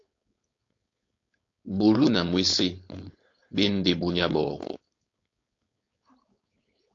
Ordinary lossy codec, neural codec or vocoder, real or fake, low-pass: AAC, 48 kbps; codec, 16 kHz, 4.8 kbps, FACodec; fake; 7.2 kHz